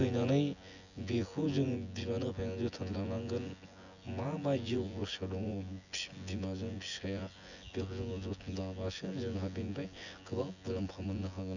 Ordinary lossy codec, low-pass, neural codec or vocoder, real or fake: none; 7.2 kHz; vocoder, 24 kHz, 100 mel bands, Vocos; fake